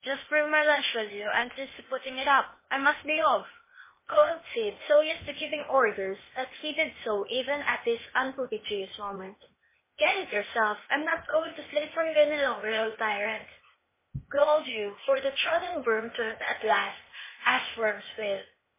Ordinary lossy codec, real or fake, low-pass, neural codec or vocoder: MP3, 16 kbps; fake; 3.6 kHz; codec, 16 kHz, 0.8 kbps, ZipCodec